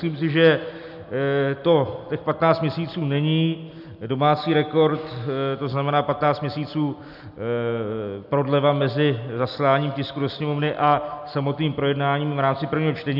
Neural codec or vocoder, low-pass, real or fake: none; 5.4 kHz; real